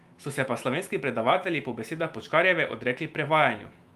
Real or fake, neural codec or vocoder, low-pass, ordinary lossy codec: fake; autoencoder, 48 kHz, 128 numbers a frame, DAC-VAE, trained on Japanese speech; 14.4 kHz; Opus, 32 kbps